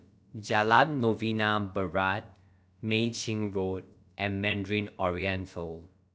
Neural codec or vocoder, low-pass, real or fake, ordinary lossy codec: codec, 16 kHz, about 1 kbps, DyCAST, with the encoder's durations; none; fake; none